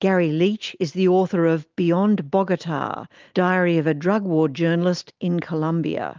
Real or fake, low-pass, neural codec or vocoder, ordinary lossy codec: real; 7.2 kHz; none; Opus, 24 kbps